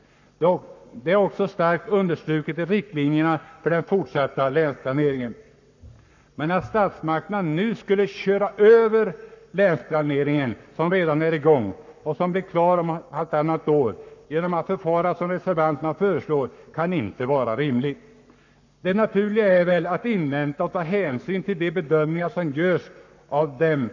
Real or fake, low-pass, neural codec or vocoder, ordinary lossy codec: fake; 7.2 kHz; codec, 44.1 kHz, 7.8 kbps, Pupu-Codec; none